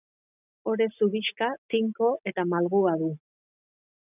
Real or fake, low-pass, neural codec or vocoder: real; 3.6 kHz; none